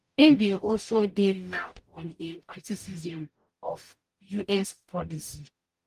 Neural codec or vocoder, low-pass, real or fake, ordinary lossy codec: codec, 44.1 kHz, 0.9 kbps, DAC; 14.4 kHz; fake; Opus, 32 kbps